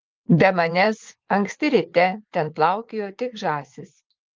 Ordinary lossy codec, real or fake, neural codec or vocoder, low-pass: Opus, 24 kbps; fake; vocoder, 22.05 kHz, 80 mel bands, WaveNeXt; 7.2 kHz